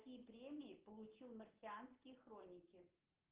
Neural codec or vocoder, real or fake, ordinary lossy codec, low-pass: none; real; Opus, 16 kbps; 3.6 kHz